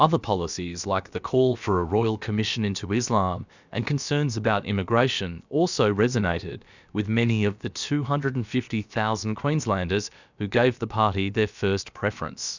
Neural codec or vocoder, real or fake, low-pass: codec, 16 kHz, about 1 kbps, DyCAST, with the encoder's durations; fake; 7.2 kHz